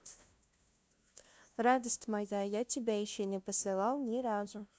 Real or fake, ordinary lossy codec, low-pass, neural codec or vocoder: fake; none; none; codec, 16 kHz, 0.5 kbps, FunCodec, trained on LibriTTS, 25 frames a second